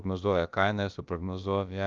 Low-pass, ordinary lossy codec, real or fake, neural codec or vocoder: 7.2 kHz; Opus, 32 kbps; fake; codec, 16 kHz, about 1 kbps, DyCAST, with the encoder's durations